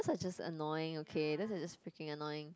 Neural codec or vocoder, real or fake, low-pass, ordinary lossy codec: none; real; none; none